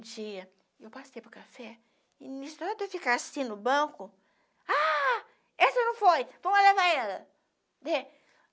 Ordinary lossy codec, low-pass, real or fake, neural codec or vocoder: none; none; real; none